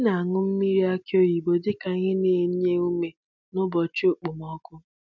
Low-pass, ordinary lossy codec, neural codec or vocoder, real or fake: 7.2 kHz; none; none; real